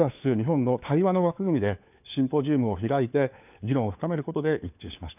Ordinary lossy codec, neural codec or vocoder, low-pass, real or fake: none; codec, 16 kHz, 4 kbps, FunCodec, trained on LibriTTS, 50 frames a second; 3.6 kHz; fake